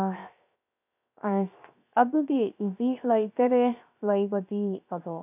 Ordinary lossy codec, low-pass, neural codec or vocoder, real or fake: none; 3.6 kHz; codec, 16 kHz, 0.3 kbps, FocalCodec; fake